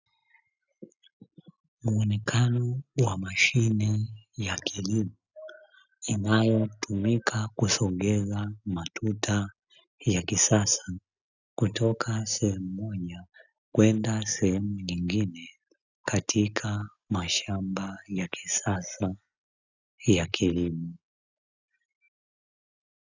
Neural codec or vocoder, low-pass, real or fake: none; 7.2 kHz; real